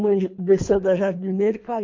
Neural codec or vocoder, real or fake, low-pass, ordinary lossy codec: codec, 24 kHz, 3 kbps, HILCodec; fake; 7.2 kHz; MP3, 48 kbps